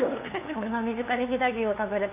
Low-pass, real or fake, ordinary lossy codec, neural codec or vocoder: 3.6 kHz; fake; AAC, 32 kbps; codec, 16 kHz, 2 kbps, FunCodec, trained on LibriTTS, 25 frames a second